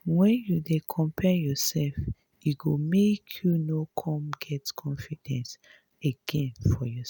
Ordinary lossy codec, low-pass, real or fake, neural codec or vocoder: none; none; real; none